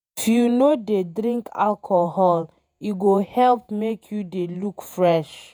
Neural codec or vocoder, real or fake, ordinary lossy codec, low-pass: vocoder, 48 kHz, 128 mel bands, Vocos; fake; none; none